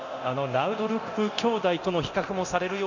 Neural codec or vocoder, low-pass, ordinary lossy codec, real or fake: codec, 24 kHz, 0.9 kbps, DualCodec; 7.2 kHz; none; fake